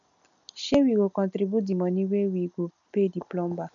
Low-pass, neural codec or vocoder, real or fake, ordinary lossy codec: 7.2 kHz; none; real; none